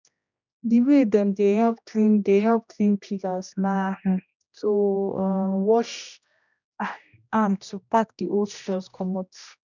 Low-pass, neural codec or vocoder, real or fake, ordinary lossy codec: 7.2 kHz; codec, 16 kHz, 1 kbps, X-Codec, HuBERT features, trained on general audio; fake; none